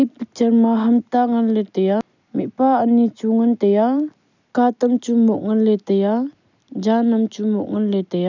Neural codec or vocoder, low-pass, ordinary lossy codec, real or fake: none; 7.2 kHz; none; real